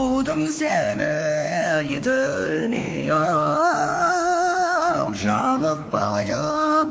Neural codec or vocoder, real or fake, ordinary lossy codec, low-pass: codec, 16 kHz, 2 kbps, X-Codec, WavLM features, trained on Multilingual LibriSpeech; fake; none; none